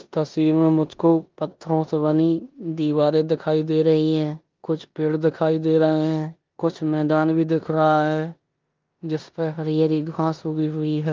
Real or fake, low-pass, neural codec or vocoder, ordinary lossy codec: fake; 7.2 kHz; codec, 16 kHz in and 24 kHz out, 0.9 kbps, LongCat-Audio-Codec, four codebook decoder; Opus, 32 kbps